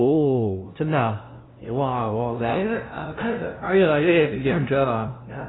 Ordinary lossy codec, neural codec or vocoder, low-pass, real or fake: AAC, 16 kbps; codec, 16 kHz, 0.5 kbps, FunCodec, trained on LibriTTS, 25 frames a second; 7.2 kHz; fake